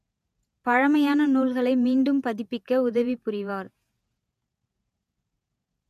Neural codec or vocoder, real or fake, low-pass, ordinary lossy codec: vocoder, 48 kHz, 128 mel bands, Vocos; fake; 14.4 kHz; MP3, 96 kbps